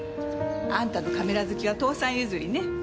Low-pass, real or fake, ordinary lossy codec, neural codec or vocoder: none; real; none; none